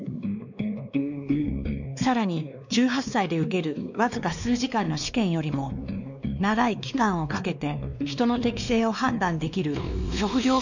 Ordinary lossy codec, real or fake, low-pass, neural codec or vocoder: none; fake; 7.2 kHz; codec, 16 kHz, 2 kbps, X-Codec, WavLM features, trained on Multilingual LibriSpeech